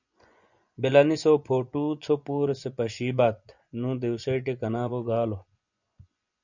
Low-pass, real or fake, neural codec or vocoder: 7.2 kHz; fake; vocoder, 24 kHz, 100 mel bands, Vocos